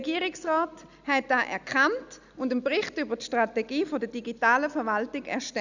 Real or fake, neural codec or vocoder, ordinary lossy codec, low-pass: real; none; none; 7.2 kHz